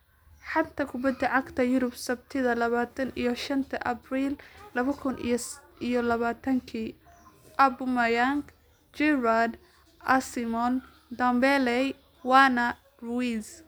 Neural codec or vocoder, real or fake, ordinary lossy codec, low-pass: none; real; none; none